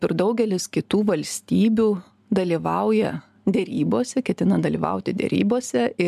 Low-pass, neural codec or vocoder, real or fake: 14.4 kHz; none; real